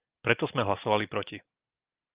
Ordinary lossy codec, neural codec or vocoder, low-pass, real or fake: Opus, 32 kbps; none; 3.6 kHz; real